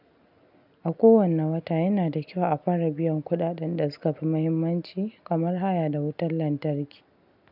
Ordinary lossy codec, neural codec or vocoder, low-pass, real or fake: none; none; 5.4 kHz; real